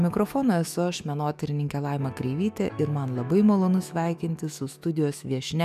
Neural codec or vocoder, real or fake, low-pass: autoencoder, 48 kHz, 128 numbers a frame, DAC-VAE, trained on Japanese speech; fake; 14.4 kHz